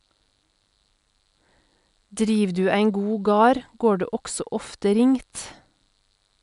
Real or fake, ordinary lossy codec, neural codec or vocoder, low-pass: real; none; none; 10.8 kHz